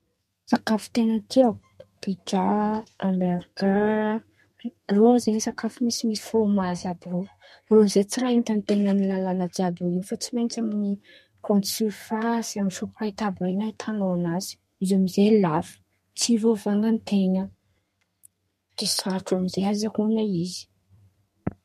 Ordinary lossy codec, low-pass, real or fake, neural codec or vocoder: MP3, 64 kbps; 14.4 kHz; fake; codec, 32 kHz, 1.9 kbps, SNAC